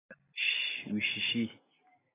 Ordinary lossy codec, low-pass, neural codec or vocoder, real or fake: AAC, 16 kbps; 3.6 kHz; none; real